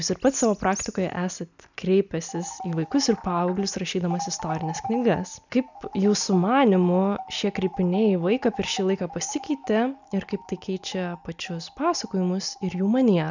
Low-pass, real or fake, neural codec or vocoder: 7.2 kHz; real; none